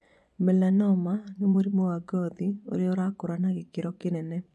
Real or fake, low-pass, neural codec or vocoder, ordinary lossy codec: fake; none; vocoder, 24 kHz, 100 mel bands, Vocos; none